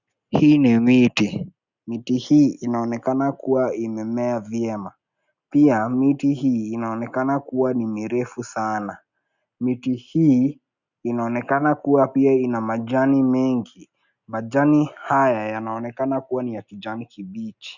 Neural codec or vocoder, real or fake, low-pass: none; real; 7.2 kHz